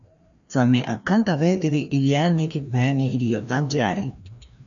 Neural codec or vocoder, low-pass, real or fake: codec, 16 kHz, 1 kbps, FreqCodec, larger model; 7.2 kHz; fake